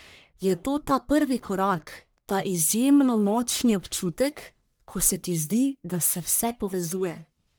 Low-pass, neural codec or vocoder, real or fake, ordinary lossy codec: none; codec, 44.1 kHz, 1.7 kbps, Pupu-Codec; fake; none